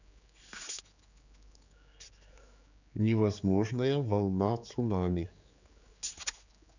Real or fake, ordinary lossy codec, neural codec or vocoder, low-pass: fake; none; codec, 16 kHz, 4 kbps, X-Codec, HuBERT features, trained on general audio; 7.2 kHz